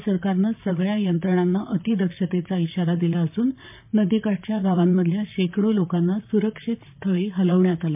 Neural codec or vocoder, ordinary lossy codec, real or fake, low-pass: vocoder, 44.1 kHz, 128 mel bands, Pupu-Vocoder; MP3, 32 kbps; fake; 3.6 kHz